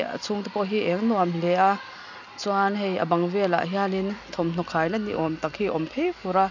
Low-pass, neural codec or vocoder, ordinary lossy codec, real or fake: 7.2 kHz; none; none; real